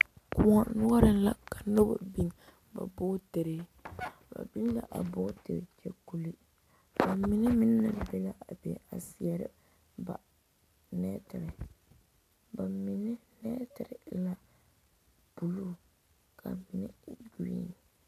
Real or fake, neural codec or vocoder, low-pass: real; none; 14.4 kHz